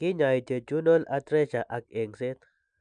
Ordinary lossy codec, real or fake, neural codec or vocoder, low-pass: MP3, 96 kbps; real; none; 9.9 kHz